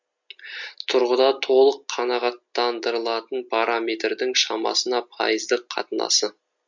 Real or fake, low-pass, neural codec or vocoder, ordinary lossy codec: real; 7.2 kHz; none; MP3, 48 kbps